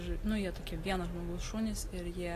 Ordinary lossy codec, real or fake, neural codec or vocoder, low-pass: AAC, 48 kbps; real; none; 14.4 kHz